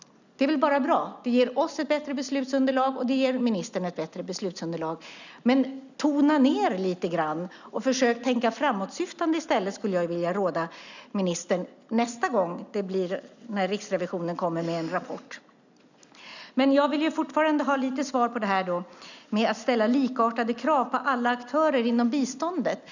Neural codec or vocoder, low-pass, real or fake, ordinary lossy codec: none; 7.2 kHz; real; none